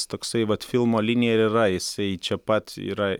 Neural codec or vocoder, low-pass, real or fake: none; 19.8 kHz; real